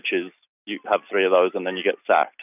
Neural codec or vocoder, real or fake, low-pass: none; real; 3.6 kHz